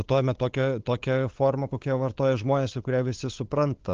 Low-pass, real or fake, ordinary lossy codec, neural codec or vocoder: 7.2 kHz; fake; Opus, 16 kbps; codec, 16 kHz, 8 kbps, FunCodec, trained on LibriTTS, 25 frames a second